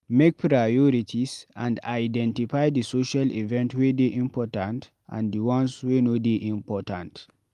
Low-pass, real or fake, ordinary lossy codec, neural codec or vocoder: 14.4 kHz; real; Opus, 32 kbps; none